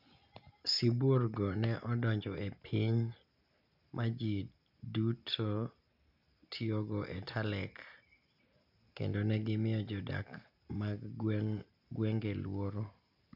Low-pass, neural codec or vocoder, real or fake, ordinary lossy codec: 5.4 kHz; none; real; none